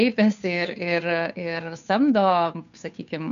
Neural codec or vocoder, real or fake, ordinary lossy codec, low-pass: codec, 16 kHz, 2 kbps, FunCodec, trained on Chinese and English, 25 frames a second; fake; MP3, 96 kbps; 7.2 kHz